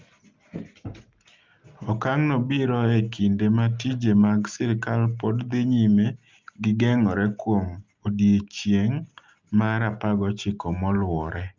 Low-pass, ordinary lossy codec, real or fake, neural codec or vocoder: 7.2 kHz; Opus, 24 kbps; real; none